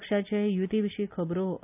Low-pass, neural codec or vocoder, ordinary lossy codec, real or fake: 3.6 kHz; none; none; real